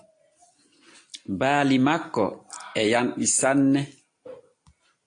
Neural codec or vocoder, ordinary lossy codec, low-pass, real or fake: none; AAC, 48 kbps; 9.9 kHz; real